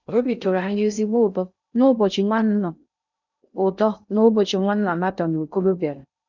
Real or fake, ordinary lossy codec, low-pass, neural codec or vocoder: fake; none; 7.2 kHz; codec, 16 kHz in and 24 kHz out, 0.6 kbps, FocalCodec, streaming, 2048 codes